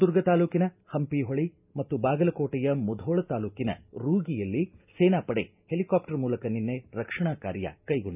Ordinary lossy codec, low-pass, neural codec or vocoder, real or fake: none; 3.6 kHz; none; real